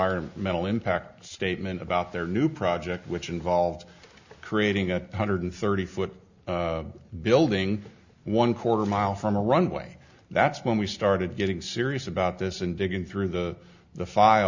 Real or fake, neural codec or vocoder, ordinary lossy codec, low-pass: real; none; Opus, 64 kbps; 7.2 kHz